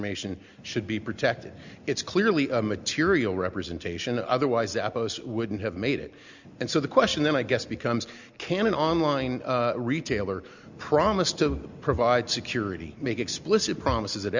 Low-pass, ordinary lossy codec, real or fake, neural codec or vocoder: 7.2 kHz; Opus, 64 kbps; real; none